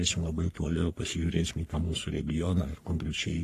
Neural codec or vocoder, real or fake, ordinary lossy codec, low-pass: codec, 44.1 kHz, 3.4 kbps, Pupu-Codec; fake; AAC, 48 kbps; 14.4 kHz